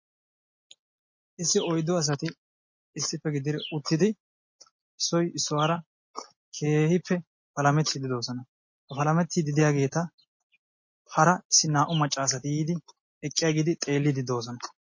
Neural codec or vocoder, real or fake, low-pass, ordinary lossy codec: none; real; 7.2 kHz; MP3, 32 kbps